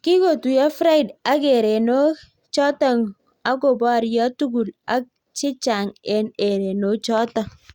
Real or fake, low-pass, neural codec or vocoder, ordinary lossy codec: real; 19.8 kHz; none; Opus, 64 kbps